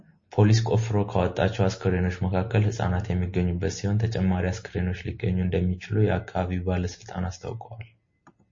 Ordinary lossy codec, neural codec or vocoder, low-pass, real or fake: MP3, 32 kbps; none; 7.2 kHz; real